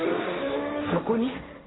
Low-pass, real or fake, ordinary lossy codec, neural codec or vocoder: 7.2 kHz; fake; AAC, 16 kbps; codec, 16 kHz, 1.1 kbps, Voila-Tokenizer